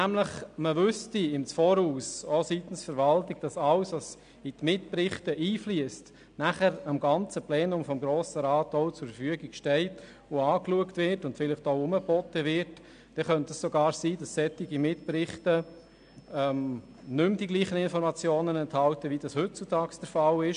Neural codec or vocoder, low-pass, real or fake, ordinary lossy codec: none; 9.9 kHz; real; AAC, 96 kbps